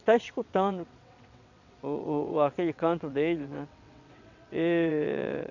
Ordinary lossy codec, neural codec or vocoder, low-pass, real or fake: none; vocoder, 22.05 kHz, 80 mel bands, WaveNeXt; 7.2 kHz; fake